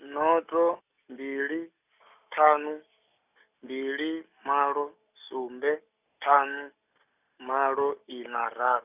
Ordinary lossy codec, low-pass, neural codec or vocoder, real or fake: none; 3.6 kHz; none; real